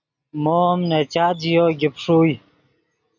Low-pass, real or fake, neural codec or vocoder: 7.2 kHz; real; none